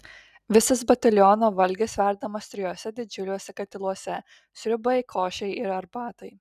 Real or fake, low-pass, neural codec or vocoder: real; 14.4 kHz; none